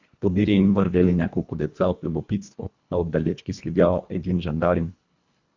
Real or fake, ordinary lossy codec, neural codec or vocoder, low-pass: fake; Opus, 64 kbps; codec, 24 kHz, 1.5 kbps, HILCodec; 7.2 kHz